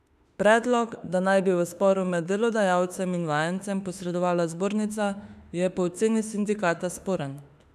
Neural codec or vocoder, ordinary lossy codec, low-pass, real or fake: autoencoder, 48 kHz, 32 numbers a frame, DAC-VAE, trained on Japanese speech; none; 14.4 kHz; fake